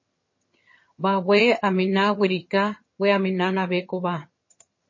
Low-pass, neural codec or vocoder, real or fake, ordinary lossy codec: 7.2 kHz; vocoder, 22.05 kHz, 80 mel bands, HiFi-GAN; fake; MP3, 32 kbps